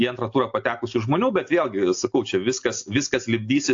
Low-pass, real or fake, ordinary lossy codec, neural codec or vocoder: 7.2 kHz; real; AAC, 48 kbps; none